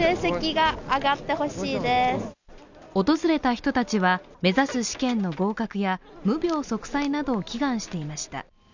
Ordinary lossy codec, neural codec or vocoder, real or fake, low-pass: none; none; real; 7.2 kHz